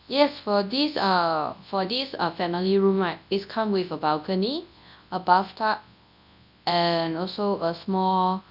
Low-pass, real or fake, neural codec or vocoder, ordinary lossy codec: 5.4 kHz; fake; codec, 24 kHz, 0.9 kbps, WavTokenizer, large speech release; none